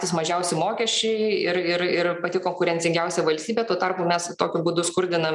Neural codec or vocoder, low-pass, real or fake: none; 10.8 kHz; real